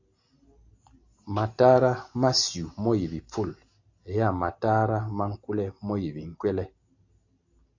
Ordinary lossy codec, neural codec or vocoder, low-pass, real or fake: AAC, 48 kbps; none; 7.2 kHz; real